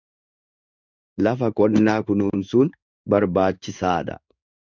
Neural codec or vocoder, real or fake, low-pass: codec, 16 kHz in and 24 kHz out, 1 kbps, XY-Tokenizer; fake; 7.2 kHz